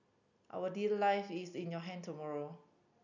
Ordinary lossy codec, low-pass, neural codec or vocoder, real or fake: none; 7.2 kHz; none; real